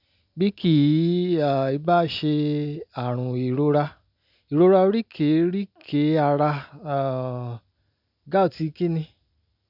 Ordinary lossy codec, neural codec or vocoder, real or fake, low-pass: none; none; real; 5.4 kHz